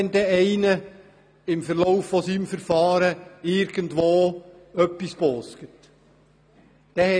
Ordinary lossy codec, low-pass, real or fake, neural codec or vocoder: none; 9.9 kHz; real; none